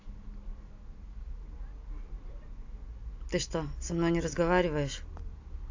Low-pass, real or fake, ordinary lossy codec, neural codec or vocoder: 7.2 kHz; real; AAC, 48 kbps; none